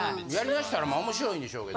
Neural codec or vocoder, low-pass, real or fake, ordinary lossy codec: none; none; real; none